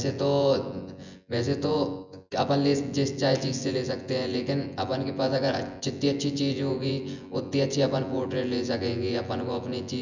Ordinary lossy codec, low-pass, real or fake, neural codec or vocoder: none; 7.2 kHz; fake; vocoder, 24 kHz, 100 mel bands, Vocos